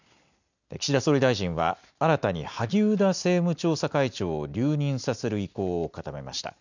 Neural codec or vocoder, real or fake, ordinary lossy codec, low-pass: none; real; none; 7.2 kHz